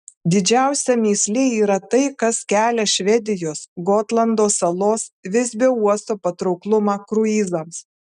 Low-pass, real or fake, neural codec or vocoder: 10.8 kHz; real; none